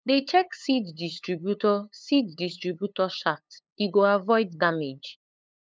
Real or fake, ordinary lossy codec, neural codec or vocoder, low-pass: fake; none; codec, 16 kHz, 8 kbps, FunCodec, trained on LibriTTS, 25 frames a second; none